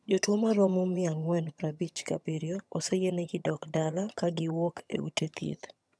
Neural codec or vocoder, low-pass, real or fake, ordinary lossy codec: vocoder, 22.05 kHz, 80 mel bands, HiFi-GAN; none; fake; none